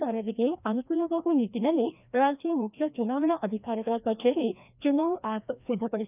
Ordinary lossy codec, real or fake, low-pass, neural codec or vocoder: none; fake; 3.6 kHz; codec, 16 kHz, 1 kbps, FreqCodec, larger model